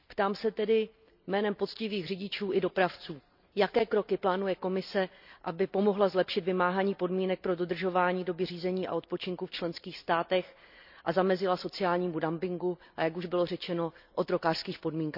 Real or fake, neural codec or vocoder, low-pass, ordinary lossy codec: real; none; 5.4 kHz; none